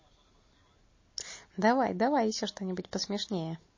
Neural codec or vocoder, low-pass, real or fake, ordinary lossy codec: none; 7.2 kHz; real; MP3, 32 kbps